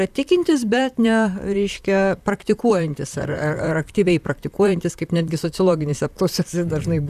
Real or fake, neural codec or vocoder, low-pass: fake; vocoder, 44.1 kHz, 128 mel bands, Pupu-Vocoder; 14.4 kHz